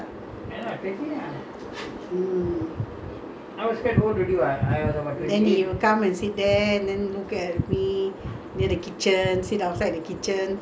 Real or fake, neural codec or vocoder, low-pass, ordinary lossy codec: real; none; none; none